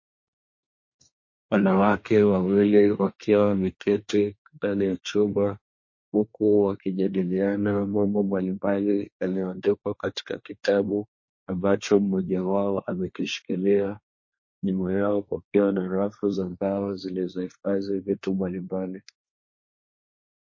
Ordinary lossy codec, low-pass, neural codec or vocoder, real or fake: MP3, 32 kbps; 7.2 kHz; codec, 24 kHz, 1 kbps, SNAC; fake